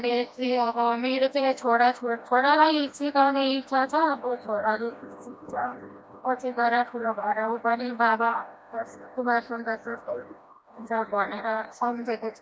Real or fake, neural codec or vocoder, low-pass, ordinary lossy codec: fake; codec, 16 kHz, 1 kbps, FreqCodec, smaller model; none; none